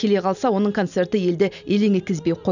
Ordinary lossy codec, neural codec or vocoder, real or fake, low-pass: none; none; real; 7.2 kHz